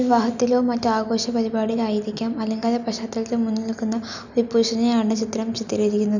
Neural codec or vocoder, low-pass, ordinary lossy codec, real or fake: none; 7.2 kHz; none; real